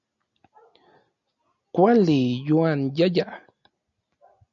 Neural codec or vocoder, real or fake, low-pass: none; real; 7.2 kHz